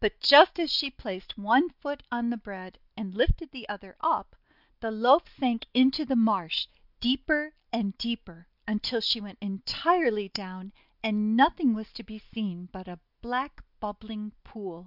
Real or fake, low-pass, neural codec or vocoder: real; 5.4 kHz; none